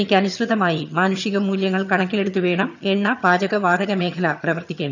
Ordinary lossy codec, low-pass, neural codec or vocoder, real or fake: none; 7.2 kHz; vocoder, 22.05 kHz, 80 mel bands, HiFi-GAN; fake